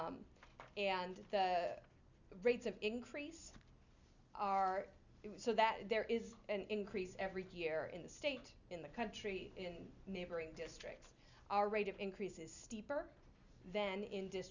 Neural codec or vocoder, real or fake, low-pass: none; real; 7.2 kHz